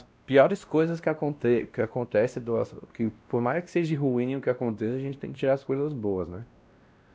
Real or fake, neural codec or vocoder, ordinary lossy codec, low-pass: fake; codec, 16 kHz, 1 kbps, X-Codec, WavLM features, trained on Multilingual LibriSpeech; none; none